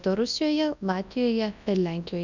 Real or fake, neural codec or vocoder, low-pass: fake; codec, 24 kHz, 0.9 kbps, WavTokenizer, large speech release; 7.2 kHz